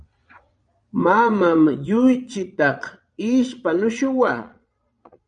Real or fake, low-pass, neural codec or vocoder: fake; 9.9 kHz; vocoder, 22.05 kHz, 80 mel bands, Vocos